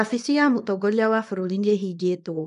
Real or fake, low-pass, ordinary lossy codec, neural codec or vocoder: fake; 10.8 kHz; none; codec, 24 kHz, 0.9 kbps, WavTokenizer, small release